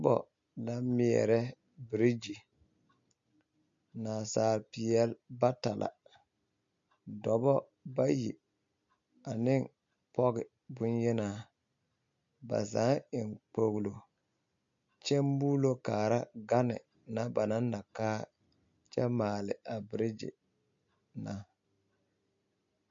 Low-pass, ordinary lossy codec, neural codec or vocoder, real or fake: 7.2 kHz; MP3, 48 kbps; none; real